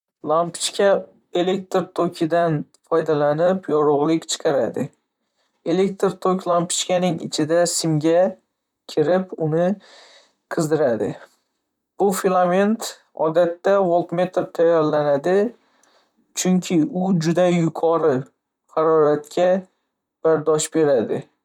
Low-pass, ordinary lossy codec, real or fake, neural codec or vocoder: 19.8 kHz; none; fake; vocoder, 44.1 kHz, 128 mel bands, Pupu-Vocoder